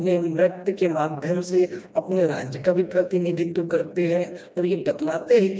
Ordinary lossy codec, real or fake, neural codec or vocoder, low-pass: none; fake; codec, 16 kHz, 1 kbps, FreqCodec, smaller model; none